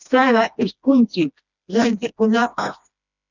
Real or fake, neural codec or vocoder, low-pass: fake; codec, 16 kHz, 1 kbps, FreqCodec, smaller model; 7.2 kHz